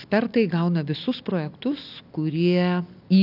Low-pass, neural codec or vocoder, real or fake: 5.4 kHz; none; real